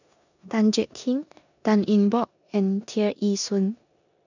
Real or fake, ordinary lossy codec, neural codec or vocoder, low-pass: fake; none; codec, 16 kHz in and 24 kHz out, 0.9 kbps, LongCat-Audio-Codec, four codebook decoder; 7.2 kHz